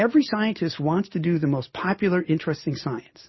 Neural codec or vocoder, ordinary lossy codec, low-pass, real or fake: none; MP3, 24 kbps; 7.2 kHz; real